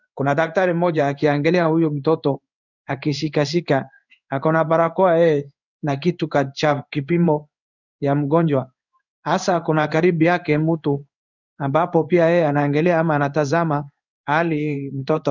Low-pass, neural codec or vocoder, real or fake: 7.2 kHz; codec, 16 kHz in and 24 kHz out, 1 kbps, XY-Tokenizer; fake